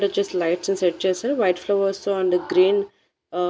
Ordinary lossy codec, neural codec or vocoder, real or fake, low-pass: none; none; real; none